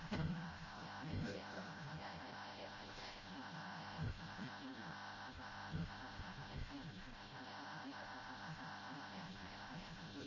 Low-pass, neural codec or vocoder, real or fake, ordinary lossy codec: 7.2 kHz; codec, 16 kHz, 0.5 kbps, FreqCodec, smaller model; fake; MP3, 32 kbps